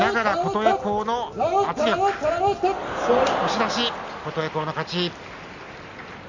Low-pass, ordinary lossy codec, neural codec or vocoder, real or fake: 7.2 kHz; Opus, 64 kbps; none; real